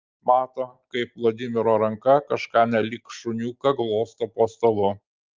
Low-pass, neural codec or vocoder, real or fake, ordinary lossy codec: 7.2 kHz; none; real; Opus, 24 kbps